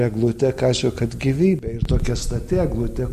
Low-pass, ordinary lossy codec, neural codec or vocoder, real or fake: 14.4 kHz; MP3, 96 kbps; none; real